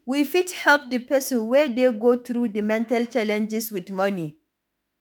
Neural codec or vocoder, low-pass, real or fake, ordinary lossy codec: autoencoder, 48 kHz, 32 numbers a frame, DAC-VAE, trained on Japanese speech; none; fake; none